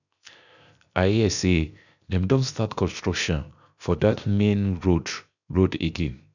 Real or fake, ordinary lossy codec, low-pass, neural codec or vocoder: fake; none; 7.2 kHz; codec, 16 kHz, 0.7 kbps, FocalCodec